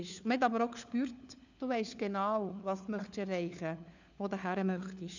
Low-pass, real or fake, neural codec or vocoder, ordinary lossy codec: 7.2 kHz; fake; codec, 16 kHz, 4 kbps, FunCodec, trained on LibriTTS, 50 frames a second; none